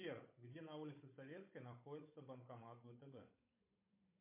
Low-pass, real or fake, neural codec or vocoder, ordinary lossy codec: 3.6 kHz; fake; codec, 16 kHz, 16 kbps, FunCodec, trained on Chinese and English, 50 frames a second; MP3, 24 kbps